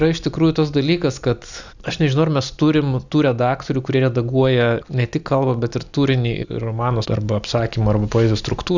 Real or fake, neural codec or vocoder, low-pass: real; none; 7.2 kHz